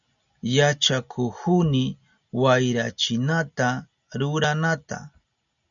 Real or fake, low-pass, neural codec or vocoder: real; 7.2 kHz; none